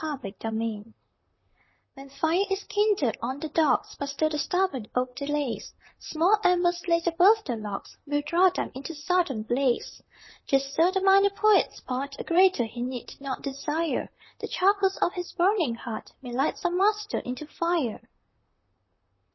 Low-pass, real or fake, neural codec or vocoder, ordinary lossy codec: 7.2 kHz; fake; vocoder, 44.1 kHz, 128 mel bands, Pupu-Vocoder; MP3, 24 kbps